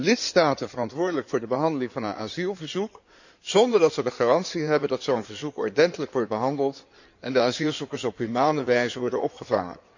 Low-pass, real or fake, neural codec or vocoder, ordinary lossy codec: 7.2 kHz; fake; codec, 16 kHz in and 24 kHz out, 2.2 kbps, FireRedTTS-2 codec; none